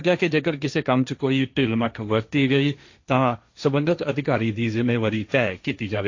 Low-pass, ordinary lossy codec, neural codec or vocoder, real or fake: 7.2 kHz; AAC, 48 kbps; codec, 16 kHz, 1.1 kbps, Voila-Tokenizer; fake